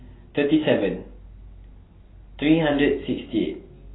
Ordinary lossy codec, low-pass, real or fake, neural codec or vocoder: AAC, 16 kbps; 7.2 kHz; real; none